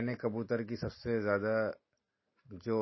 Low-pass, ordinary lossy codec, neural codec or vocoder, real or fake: 7.2 kHz; MP3, 24 kbps; none; real